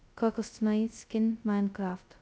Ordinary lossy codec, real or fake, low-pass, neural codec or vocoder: none; fake; none; codec, 16 kHz, 0.2 kbps, FocalCodec